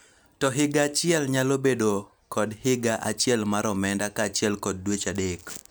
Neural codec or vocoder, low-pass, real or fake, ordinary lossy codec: none; none; real; none